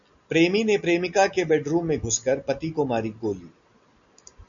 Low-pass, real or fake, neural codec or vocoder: 7.2 kHz; real; none